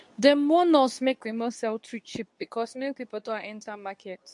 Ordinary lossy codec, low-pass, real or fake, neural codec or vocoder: MP3, 64 kbps; 10.8 kHz; fake; codec, 24 kHz, 0.9 kbps, WavTokenizer, medium speech release version 2